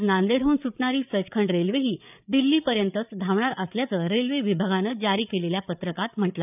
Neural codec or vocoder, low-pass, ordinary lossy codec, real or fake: codec, 16 kHz, 8 kbps, FreqCodec, larger model; 3.6 kHz; none; fake